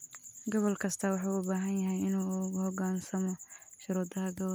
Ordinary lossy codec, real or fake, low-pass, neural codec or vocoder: none; real; none; none